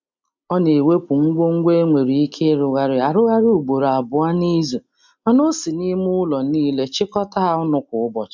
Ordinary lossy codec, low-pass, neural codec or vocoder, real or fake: MP3, 64 kbps; 7.2 kHz; none; real